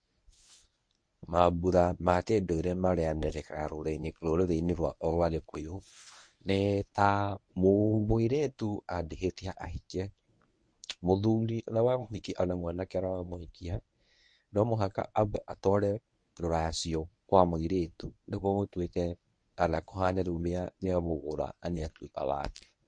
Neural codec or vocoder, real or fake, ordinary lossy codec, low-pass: codec, 24 kHz, 0.9 kbps, WavTokenizer, medium speech release version 1; fake; MP3, 48 kbps; 9.9 kHz